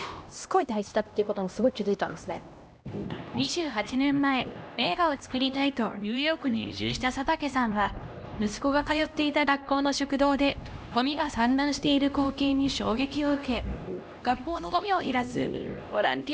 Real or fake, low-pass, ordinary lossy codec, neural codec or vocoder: fake; none; none; codec, 16 kHz, 1 kbps, X-Codec, HuBERT features, trained on LibriSpeech